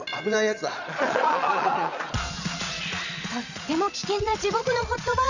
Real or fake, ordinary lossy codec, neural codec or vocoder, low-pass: fake; Opus, 64 kbps; vocoder, 22.05 kHz, 80 mel bands, Vocos; 7.2 kHz